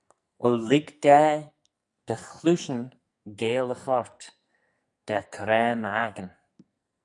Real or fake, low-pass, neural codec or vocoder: fake; 10.8 kHz; codec, 44.1 kHz, 2.6 kbps, SNAC